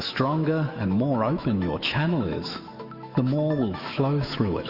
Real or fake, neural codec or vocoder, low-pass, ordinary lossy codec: fake; autoencoder, 48 kHz, 128 numbers a frame, DAC-VAE, trained on Japanese speech; 5.4 kHz; Opus, 64 kbps